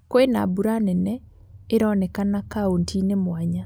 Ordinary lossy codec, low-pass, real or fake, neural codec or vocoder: none; none; real; none